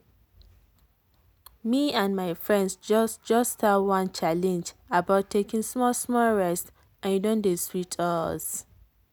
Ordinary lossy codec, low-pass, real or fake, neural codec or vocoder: none; none; real; none